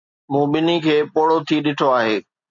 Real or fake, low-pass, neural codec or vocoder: real; 7.2 kHz; none